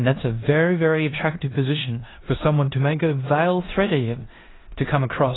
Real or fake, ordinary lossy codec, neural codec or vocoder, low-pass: fake; AAC, 16 kbps; codec, 16 kHz in and 24 kHz out, 0.9 kbps, LongCat-Audio-Codec, four codebook decoder; 7.2 kHz